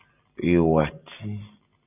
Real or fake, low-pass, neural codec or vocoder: real; 3.6 kHz; none